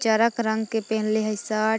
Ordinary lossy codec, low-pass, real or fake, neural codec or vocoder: none; none; real; none